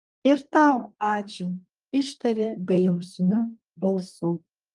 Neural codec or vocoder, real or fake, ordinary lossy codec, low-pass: codec, 24 kHz, 1 kbps, SNAC; fake; Opus, 32 kbps; 10.8 kHz